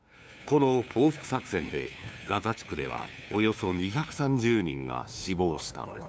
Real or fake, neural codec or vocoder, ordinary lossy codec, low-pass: fake; codec, 16 kHz, 2 kbps, FunCodec, trained on LibriTTS, 25 frames a second; none; none